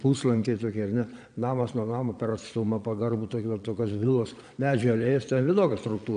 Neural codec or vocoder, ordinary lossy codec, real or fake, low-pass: vocoder, 22.05 kHz, 80 mel bands, Vocos; AAC, 96 kbps; fake; 9.9 kHz